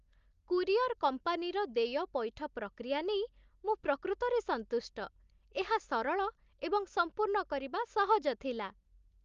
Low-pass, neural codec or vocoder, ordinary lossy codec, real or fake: 7.2 kHz; none; Opus, 32 kbps; real